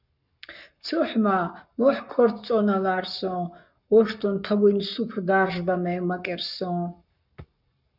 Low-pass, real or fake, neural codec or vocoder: 5.4 kHz; fake; codec, 44.1 kHz, 7.8 kbps, Pupu-Codec